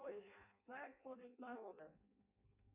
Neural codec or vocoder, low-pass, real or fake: codec, 16 kHz in and 24 kHz out, 0.6 kbps, FireRedTTS-2 codec; 3.6 kHz; fake